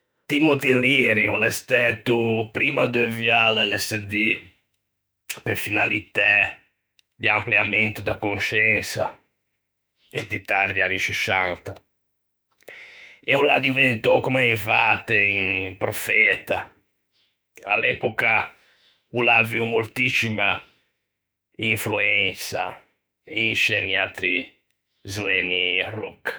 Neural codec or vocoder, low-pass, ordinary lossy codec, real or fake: autoencoder, 48 kHz, 32 numbers a frame, DAC-VAE, trained on Japanese speech; none; none; fake